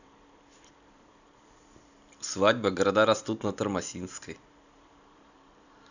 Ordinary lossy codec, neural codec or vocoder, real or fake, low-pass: none; vocoder, 44.1 kHz, 128 mel bands every 512 samples, BigVGAN v2; fake; 7.2 kHz